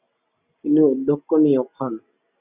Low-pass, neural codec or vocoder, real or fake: 3.6 kHz; none; real